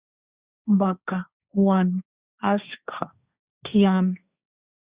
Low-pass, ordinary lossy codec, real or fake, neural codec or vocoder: 3.6 kHz; Opus, 64 kbps; fake; codec, 16 kHz, 1.1 kbps, Voila-Tokenizer